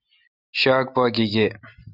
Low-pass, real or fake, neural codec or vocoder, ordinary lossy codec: 5.4 kHz; real; none; Opus, 64 kbps